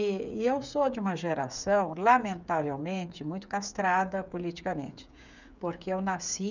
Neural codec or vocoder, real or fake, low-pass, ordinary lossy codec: codec, 16 kHz, 8 kbps, FreqCodec, smaller model; fake; 7.2 kHz; none